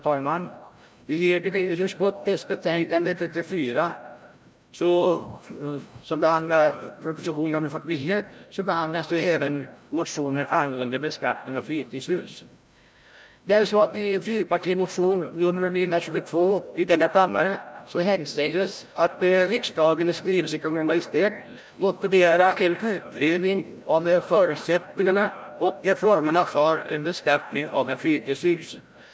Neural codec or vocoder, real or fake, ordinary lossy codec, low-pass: codec, 16 kHz, 0.5 kbps, FreqCodec, larger model; fake; none; none